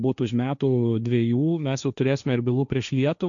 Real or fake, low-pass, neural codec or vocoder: fake; 7.2 kHz; codec, 16 kHz, 1.1 kbps, Voila-Tokenizer